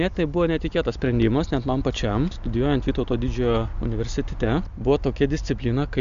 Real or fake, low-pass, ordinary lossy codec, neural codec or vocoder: real; 7.2 kHz; MP3, 96 kbps; none